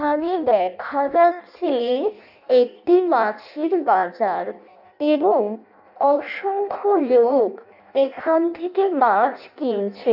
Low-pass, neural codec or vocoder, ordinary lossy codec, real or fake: 5.4 kHz; codec, 16 kHz in and 24 kHz out, 0.6 kbps, FireRedTTS-2 codec; none; fake